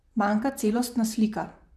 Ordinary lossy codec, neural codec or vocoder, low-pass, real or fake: none; vocoder, 44.1 kHz, 128 mel bands, Pupu-Vocoder; 14.4 kHz; fake